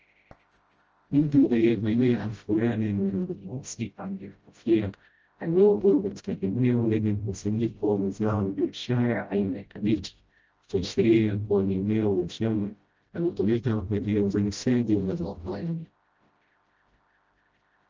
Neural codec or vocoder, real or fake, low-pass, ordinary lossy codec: codec, 16 kHz, 0.5 kbps, FreqCodec, smaller model; fake; 7.2 kHz; Opus, 24 kbps